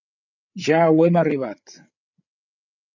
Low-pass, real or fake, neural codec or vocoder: 7.2 kHz; fake; codec, 16 kHz, 8 kbps, FreqCodec, larger model